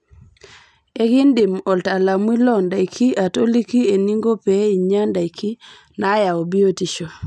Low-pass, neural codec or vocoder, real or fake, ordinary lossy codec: none; none; real; none